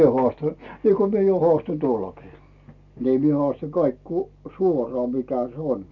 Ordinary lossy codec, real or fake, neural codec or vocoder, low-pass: none; real; none; 7.2 kHz